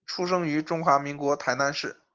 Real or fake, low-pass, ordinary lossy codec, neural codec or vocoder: real; 7.2 kHz; Opus, 32 kbps; none